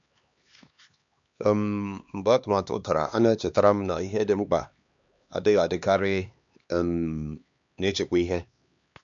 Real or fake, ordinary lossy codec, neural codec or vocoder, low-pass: fake; MP3, 64 kbps; codec, 16 kHz, 2 kbps, X-Codec, HuBERT features, trained on LibriSpeech; 7.2 kHz